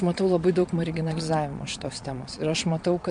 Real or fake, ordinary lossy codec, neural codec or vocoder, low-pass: fake; MP3, 64 kbps; vocoder, 22.05 kHz, 80 mel bands, Vocos; 9.9 kHz